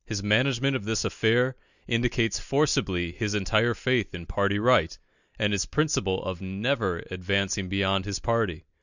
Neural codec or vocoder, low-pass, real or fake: none; 7.2 kHz; real